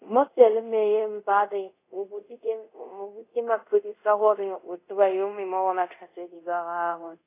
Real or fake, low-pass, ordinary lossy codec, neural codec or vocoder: fake; 3.6 kHz; AAC, 24 kbps; codec, 24 kHz, 0.5 kbps, DualCodec